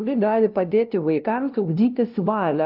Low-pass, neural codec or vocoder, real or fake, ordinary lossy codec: 5.4 kHz; codec, 16 kHz, 0.5 kbps, FunCodec, trained on LibriTTS, 25 frames a second; fake; Opus, 32 kbps